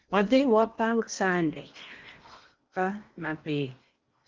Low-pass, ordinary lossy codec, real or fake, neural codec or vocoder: 7.2 kHz; Opus, 32 kbps; fake; codec, 16 kHz in and 24 kHz out, 0.8 kbps, FocalCodec, streaming, 65536 codes